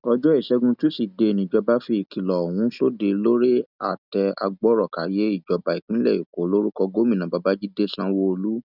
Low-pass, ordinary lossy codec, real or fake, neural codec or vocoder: 5.4 kHz; none; real; none